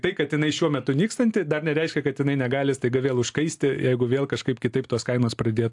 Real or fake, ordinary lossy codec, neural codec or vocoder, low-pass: real; AAC, 64 kbps; none; 10.8 kHz